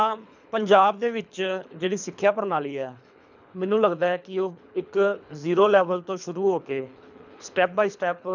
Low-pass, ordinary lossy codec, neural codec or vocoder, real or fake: 7.2 kHz; none; codec, 24 kHz, 3 kbps, HILCodec; fake